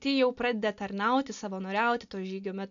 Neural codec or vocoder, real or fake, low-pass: none; real; 7.2 kHz